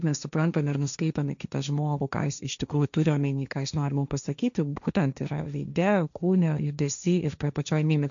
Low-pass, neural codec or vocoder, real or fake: 7.2 kHz; codec, 16 kHz, 1.1 kbps, Voila-Tokenizer; fake